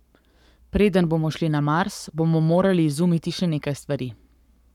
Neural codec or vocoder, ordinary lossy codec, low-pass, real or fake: codec, 44.1 kHz, 7.8 kbps, Pupu-Codec; none; 19.8 kHz; fake